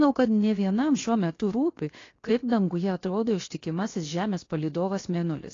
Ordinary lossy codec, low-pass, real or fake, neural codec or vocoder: AAC, 32 kbps; 7.2 kHz; fake; codec, 16 kHz, 0.7 kbps, FocalCodec